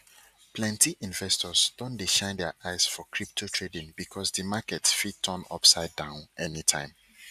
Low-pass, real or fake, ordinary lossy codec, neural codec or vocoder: 14.4 kHz; real; none; none